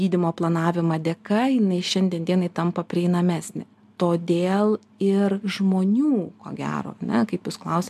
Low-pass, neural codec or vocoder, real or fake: 14.4 kHz; none; real